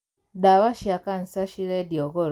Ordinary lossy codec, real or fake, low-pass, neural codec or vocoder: Opus, 32 kbps; real; 19.8 kHz; none